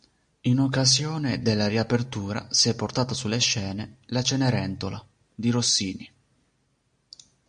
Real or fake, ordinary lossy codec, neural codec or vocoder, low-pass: real; MP3, 48 kbps; none; 9.9 kHz